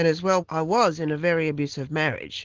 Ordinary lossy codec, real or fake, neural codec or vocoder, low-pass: Opus, 16 kbps; real; none; 7.2 kHz